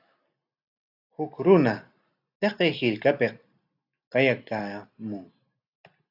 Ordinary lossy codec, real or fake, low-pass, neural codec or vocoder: AAC, 48 kbps; real; 5.4 kHz; none